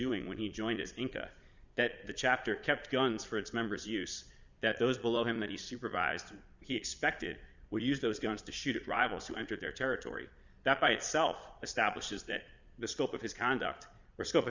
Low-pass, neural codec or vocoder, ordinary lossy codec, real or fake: 7.2 kHz; vocoder, 22.05 kHz, 80 mel bands, Vocos; Opus, 64 kbps; fake